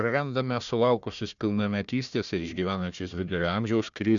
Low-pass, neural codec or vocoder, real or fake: 7.2 kHz; codec, 16 kHz, 1 kbps, FunCodec, trained on Chinese and English, 50 frames a second; fake